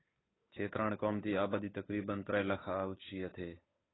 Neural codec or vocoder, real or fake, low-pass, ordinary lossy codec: codec, 16 kHz, 8 kbps, FunCodec, trained on Chinese and English, 25 frames a second; fake; 7.2 kHz; AAC, 16 kbps